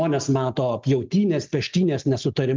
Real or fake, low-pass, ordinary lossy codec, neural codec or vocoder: real; 7.2 kHz; Opus, 32 kbps; none